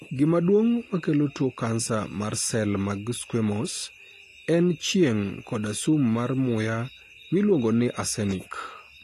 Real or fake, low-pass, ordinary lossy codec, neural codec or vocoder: real; 14.4 kHz; AAC, 48 kbps; none